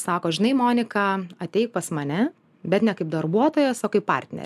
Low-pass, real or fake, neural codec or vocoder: 14.4 kHz; real; none